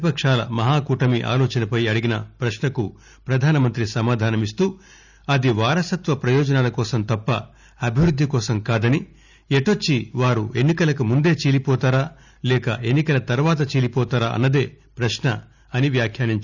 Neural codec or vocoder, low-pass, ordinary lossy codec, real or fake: none; 7.2 kHz; none; real